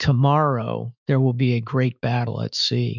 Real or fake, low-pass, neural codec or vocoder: fake; 7.2 kHz; autoencoder, 48 kHz, 128 numbers a frame, DAC-VAE, trained on Japanese speech